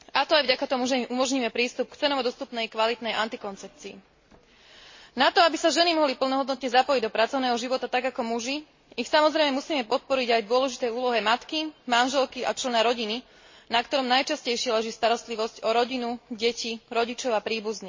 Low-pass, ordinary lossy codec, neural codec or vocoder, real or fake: 7.2 kHz; MP3, 32 kbps; none; real